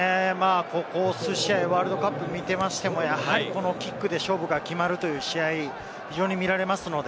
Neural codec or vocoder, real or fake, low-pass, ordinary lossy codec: none; real; none; none